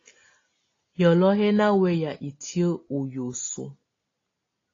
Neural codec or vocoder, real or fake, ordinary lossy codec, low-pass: none; real; AAC, 32 kbps; 7.2 kHz